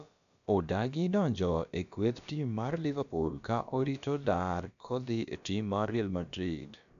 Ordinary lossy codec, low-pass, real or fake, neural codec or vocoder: none; 7.2 kHz; fake; codec, 16 kHz, about 1 kbps, DyCAST, with the encoder's durations